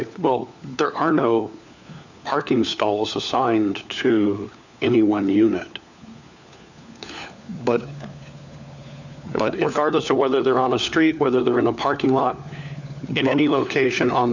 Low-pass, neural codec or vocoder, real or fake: 7.2 kHz; codec, 16 kHz, 4 kbps, FunCodec, trained on LibriTTS, 50 frames a second; fake